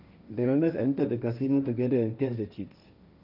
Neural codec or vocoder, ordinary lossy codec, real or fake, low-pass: codec, 16 kHz, 1.1 kbps, Voila-Tokenizer; none; fake; 5.4 kHz